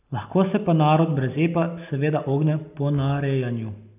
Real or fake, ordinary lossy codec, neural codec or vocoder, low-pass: real; none; none; 3.6 kHz